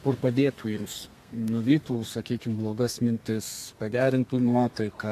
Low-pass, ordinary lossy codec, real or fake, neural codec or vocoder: 14.4 kHz; AAC, 64 kbps; fake; codec, 32 kHz, 1.9 kbps, SNAC